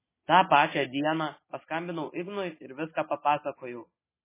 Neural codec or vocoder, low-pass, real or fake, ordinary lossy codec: none; 3.6 kHz; real; MP3, 16 kbps